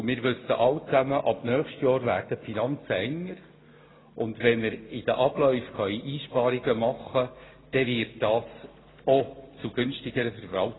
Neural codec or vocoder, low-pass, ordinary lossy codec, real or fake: none; 7.2 kHz; AAC, 16 kbps; real